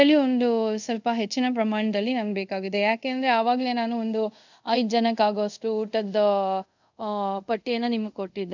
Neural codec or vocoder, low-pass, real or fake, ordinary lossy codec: codec, 24 kHz, 0.5 kbps, DualCodec; 7.2 kHz; fake; none